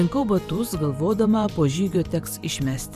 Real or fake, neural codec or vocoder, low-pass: fake; vocoder, 44.1 kHz, 128 mel bands every 512 samples, BigVGAN v2; 14.4 kHz